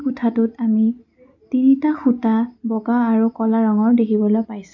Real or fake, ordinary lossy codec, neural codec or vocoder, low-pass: real; none; none; 7.2 kHz